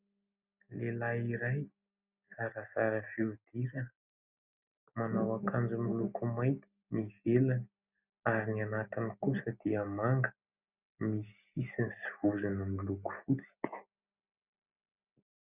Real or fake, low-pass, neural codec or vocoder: real; 3.6 kHz; none